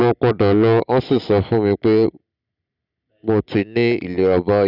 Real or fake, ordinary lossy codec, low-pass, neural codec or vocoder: real; Opus, 64 kbps; 5.4 kHz; none